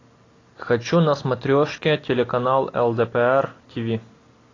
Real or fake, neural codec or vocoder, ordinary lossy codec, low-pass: real; none; AAC, 32 kbps; 7.2 kHz